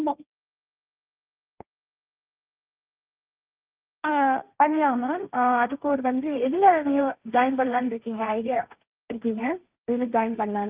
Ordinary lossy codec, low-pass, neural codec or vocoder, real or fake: Opus, 24 kbps; 3.6 kHz; codec, 16 kHz, 1.1 kbps, Voila-Tokenizer; fake